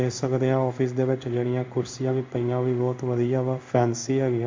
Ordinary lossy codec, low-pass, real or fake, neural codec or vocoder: MP3, 48 kbps; 7.2 kHz; fake; codec, 16 kHz in and 24 kHz out, 1 kbps, XY-Tokenizer